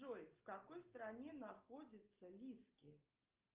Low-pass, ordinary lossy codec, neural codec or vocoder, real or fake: 3.6 kHz; Opus, 32 kbps; none; real